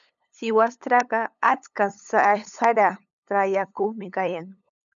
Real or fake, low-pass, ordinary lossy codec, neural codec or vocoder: fake; 7.2 kHz; MP3, 96 kbps; codec, 16 kHz, 8 kbps, FunCodec, trained on LibriTTS, 25 frames a second